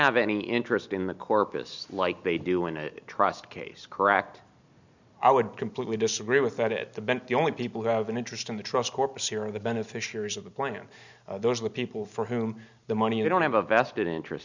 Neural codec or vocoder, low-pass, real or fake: none; 7.2 kHz; real